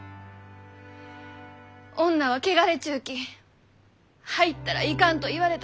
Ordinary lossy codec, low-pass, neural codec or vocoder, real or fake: none; none; none; real